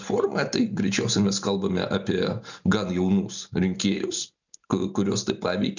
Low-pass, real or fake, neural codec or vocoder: 7.2 kHz; real; none